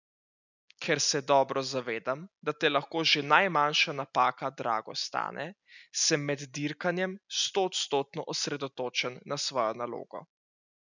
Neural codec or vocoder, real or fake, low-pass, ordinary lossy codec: none; real; 7.2 kHz; none